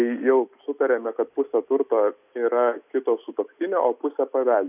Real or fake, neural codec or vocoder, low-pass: real; none; 3.6 kHz